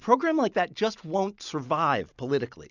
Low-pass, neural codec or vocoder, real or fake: 7.2 kHz; none; real